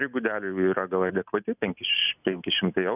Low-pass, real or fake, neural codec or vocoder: 3.6 kHz; real; none